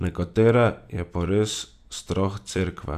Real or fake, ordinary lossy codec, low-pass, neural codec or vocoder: fake; none; 14.4 kHz; vocoder, 44.1 kHz, 128 mel bands every 256 samples, BigVGAN v2